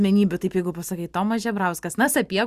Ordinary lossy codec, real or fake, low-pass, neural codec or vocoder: Opus, 64 kbps; real; 14.4 kHz; none